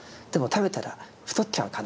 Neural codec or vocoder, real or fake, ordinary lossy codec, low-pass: none; real; none; none